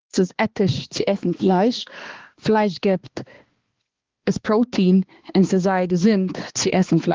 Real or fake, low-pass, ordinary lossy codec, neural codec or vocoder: fake; 7.2 kHz; Opus, 16 kbps; codec, 16 kHz, 2 kbps, X-Codec, HuBERT features, trained on balanced general audio